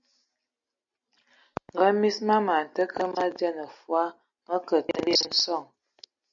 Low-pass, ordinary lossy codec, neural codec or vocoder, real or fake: 7.2 kHz; MP3, 96 kbps; none; real